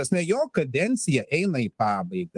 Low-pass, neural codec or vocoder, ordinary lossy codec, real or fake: 10.8 kHz; codec, 24 kHz, 3.1 kbps, DualCodec; Opus, 24 kbps; fake